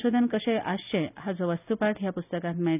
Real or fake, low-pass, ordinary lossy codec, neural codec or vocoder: real; 3.6 kHz; none; none